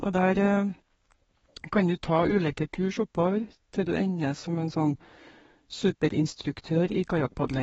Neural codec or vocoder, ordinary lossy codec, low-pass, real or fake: codec, 32 kHz, 1.9 kbps, SNAC; AAC, 24 kbps; 14.4 kHz; fake